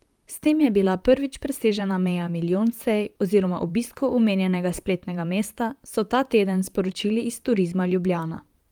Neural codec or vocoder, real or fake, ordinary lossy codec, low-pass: vocoder, 44.1 kHz, 128 mel bands, Pupu-Vocoder; fake; Opus, 32 kbps; 19.8 kHz